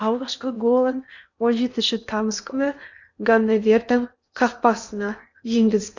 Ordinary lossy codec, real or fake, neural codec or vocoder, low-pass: none; fake; codec, 16 kHz in and 24 kHz out, 0.8 kbps, FocalCodec, streaming, 65536 codes; 7.2 kHz